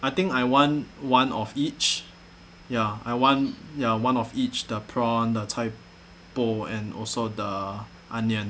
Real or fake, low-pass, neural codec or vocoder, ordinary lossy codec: real; none; none; none